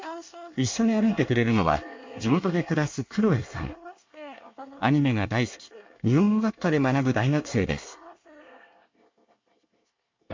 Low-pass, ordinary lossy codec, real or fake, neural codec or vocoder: 7.2 kHz; MP3, 48 kbps; fake; codec, 24 kHz, 1 kbps, SNAC